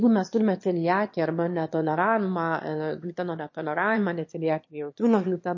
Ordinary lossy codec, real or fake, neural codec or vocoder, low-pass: MP3, 32 kbps; fake; autoencoder, 22.05 kHz, a latent of 192 numbers a frame, VITS, trained on one speaker; 7.2 kHz